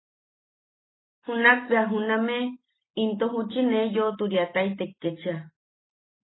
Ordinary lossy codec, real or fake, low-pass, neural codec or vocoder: AAC, 16 kbps; real; 7.2 kHz; none